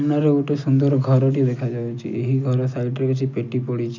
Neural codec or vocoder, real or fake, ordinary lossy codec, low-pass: none; real; none; 7.2 kHz